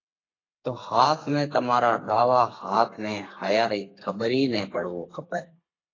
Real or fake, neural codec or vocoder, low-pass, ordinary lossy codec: fake; codec, 32 kHz, 1.9 kbps, SNAC; 7.2 kHz; AAC, 32 kbps